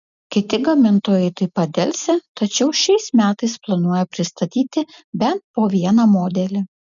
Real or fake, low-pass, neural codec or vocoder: real; 7.2 kHz; none